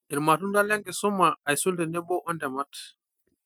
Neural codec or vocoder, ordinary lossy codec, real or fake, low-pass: none; none; real; none